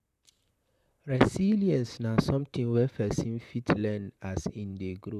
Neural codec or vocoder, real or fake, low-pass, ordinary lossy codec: vocoder, 44.1 kHz, 128 mel bands, Pupu-Vocoder; fake; 14.4 kHz; none